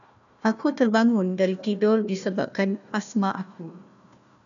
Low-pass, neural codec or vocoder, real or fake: 7.2 kHz; codec, 16 kHz, 1 kbps, FunCodec, trained on Chinese and English, 50 frames a second; fake